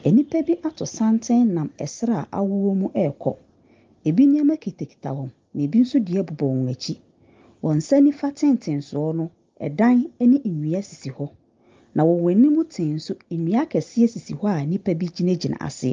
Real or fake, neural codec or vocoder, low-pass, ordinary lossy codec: real; none; 7.2 kHz; Opus, 24 kbps